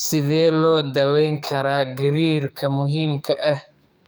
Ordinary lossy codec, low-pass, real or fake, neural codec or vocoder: none; none; fake; codec, 44.1 kHz, 2.6 kbps, SNAC